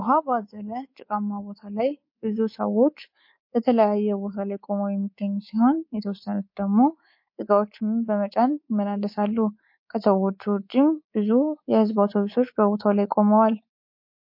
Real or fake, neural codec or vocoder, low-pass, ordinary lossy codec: fake; codec, 24 kHz, 3.1 kbps, DualCodec; 5.4 kHz; MP3, 32 kbps